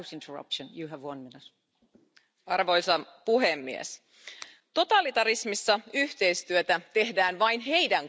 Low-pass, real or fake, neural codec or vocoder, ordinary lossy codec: none; real; none; none